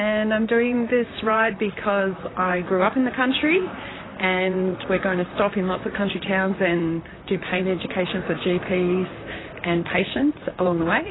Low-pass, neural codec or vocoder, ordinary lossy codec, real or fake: 7.2 kHz; vocoder, 44.1 kHz, 128 mel bands, Pupu-Vocoder; AAC, 16 kbps; fake